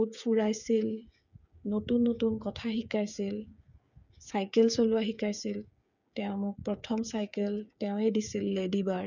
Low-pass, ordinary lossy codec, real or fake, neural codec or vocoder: 7.2 kHz; none; fake; codec, 16 kHz, 8 kbps, FreqCodec, smaller model